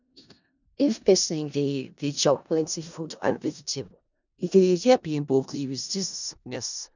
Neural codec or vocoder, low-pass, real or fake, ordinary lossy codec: codec, 16 kHz in and 24 kHz out, 0.4 kbps, LongCat-Audio-Codec, four codebook decoder; 7.2 kHz; fake; none